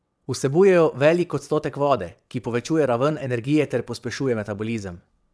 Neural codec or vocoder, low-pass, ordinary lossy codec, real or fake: vocoder, 22.05 kHz, 80 mel bands, WaveNeXt; none; none; fake